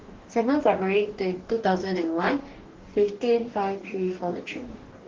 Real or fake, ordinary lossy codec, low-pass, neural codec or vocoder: fake; Opus, 16 kbps; 7.2 kHz; codec, 44.1 kHz, 2.6 kbps, DAC